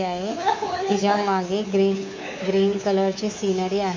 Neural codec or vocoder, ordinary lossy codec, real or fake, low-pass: codec, 24 kHz, 3.1 kbps, DualCodec; MP3, 64 kbps; fake; 7.2 kHz